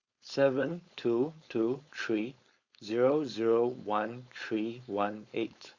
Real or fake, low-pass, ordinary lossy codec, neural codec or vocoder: fake; 7.2 kHz; Opus, 64 kbps; codec, 16 kHz, 4.8 kbps, FACodec